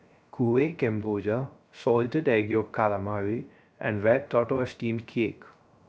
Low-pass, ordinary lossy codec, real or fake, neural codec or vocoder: none; none; fake; codec, 16 kHz, 0.3 kbps, FocalCodec